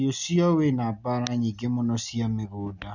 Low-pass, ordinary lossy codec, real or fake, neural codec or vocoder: 7.2 kHz; none; real; none